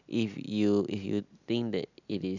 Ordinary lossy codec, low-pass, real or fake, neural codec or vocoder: none; 7.2 kHz; real; none